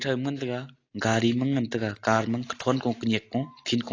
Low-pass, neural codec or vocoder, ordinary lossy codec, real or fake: 7.2 kHz; none; AAC, 32 kbps; real